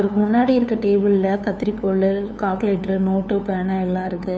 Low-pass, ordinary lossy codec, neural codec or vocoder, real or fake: none; none; codec, 16 kHz, 2 kbps, FunCodec, trained on LibriTTS, 25 frames a second; fake